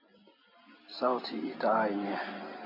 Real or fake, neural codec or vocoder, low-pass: real; none; 5.4 kHz